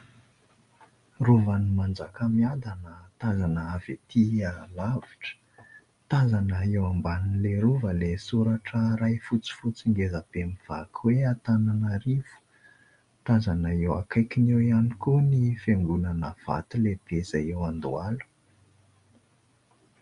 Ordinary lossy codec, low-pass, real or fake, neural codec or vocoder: MP3, 64 kbps; 10.8 kHz; real; none